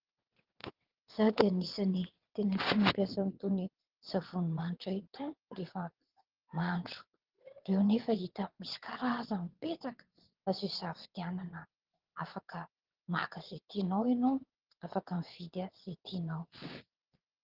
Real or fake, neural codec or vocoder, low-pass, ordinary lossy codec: fake; vocoder, 22.05 kHz, 80 mel bands, WaveNeXt; 5.4 kHz; Opus, 16 kbps